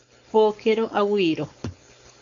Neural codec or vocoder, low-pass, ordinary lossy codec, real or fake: codec, 16 kHz, 4.8 kbps, FACodec; 7.2 kHz; MP3, 64 kbps; fake